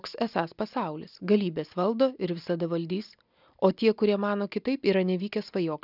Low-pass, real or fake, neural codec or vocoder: 5.4 kHz; real; none